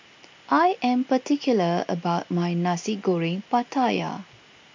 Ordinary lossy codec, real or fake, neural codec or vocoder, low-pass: MP3, 48 kbps; real; none; 7.2 kHz